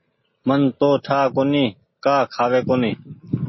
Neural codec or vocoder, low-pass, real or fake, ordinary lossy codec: none; 7.2 kHz; real; MP3, 24 kbps